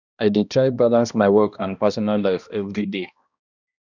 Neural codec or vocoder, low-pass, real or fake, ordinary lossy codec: codec, 16 kHz, 1 kbps, X-Codec, HuBERT features, trained on balanced general audio; 7.2 kHz; fake; none